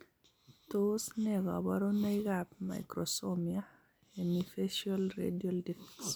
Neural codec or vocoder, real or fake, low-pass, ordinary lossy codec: none; real; none; none